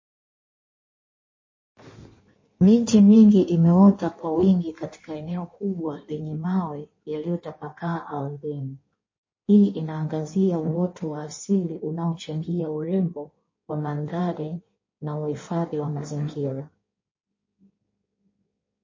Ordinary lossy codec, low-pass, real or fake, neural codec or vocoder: MP3, 32 kbps; 7.2 kHz; fake; codec, 16 kHz in and 24 kHz out, 1.1 kbps, FireRedTTS-2 codec